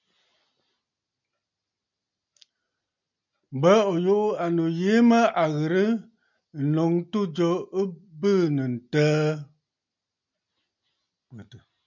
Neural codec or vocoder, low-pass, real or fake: none; 7.2 kHz; real